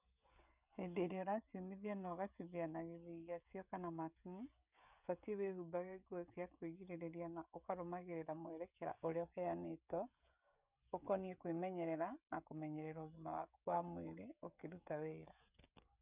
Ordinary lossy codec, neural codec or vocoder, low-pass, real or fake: none; codec, 16 kHz, 16 kbps, FreqCodec, smaller model; 3.6 kHz; fake